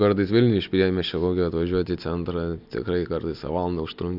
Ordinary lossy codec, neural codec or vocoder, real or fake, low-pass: AAC, 48 kbps; none; real; 5.4 kHz